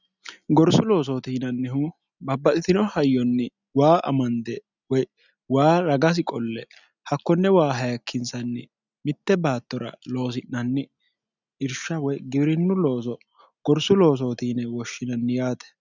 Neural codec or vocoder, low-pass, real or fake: none; 7.2 kHz; real